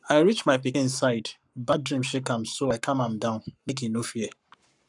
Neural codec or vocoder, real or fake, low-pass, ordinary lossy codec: codec, 44.1 kHz, 7.8 kbps, Pupu-Codec; fake; 10.8 kHz; none